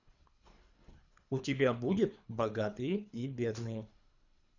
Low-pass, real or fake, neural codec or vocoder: 7.2 kHz; fake; codec, 24 kHz, 3 kbps, HILCodec